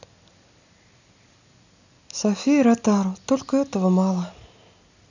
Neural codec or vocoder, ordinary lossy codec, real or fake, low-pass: none; none; real; 7.2 kHz